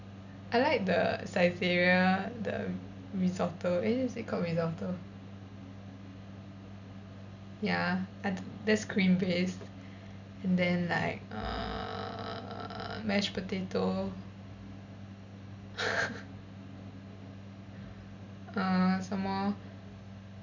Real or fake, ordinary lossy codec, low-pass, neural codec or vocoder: real; none; 7.2 kHz; none